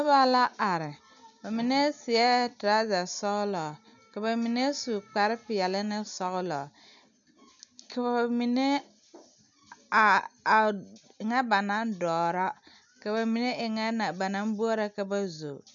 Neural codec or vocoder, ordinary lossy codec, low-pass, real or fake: none; MP3, 96 kbps; 7.2 kHz; real